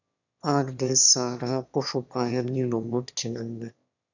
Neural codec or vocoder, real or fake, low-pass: autoencoder, 22.05 kHz, a latent of 192 numbers a frame, VITS, trained on one speaker; fake; 7.2 kHz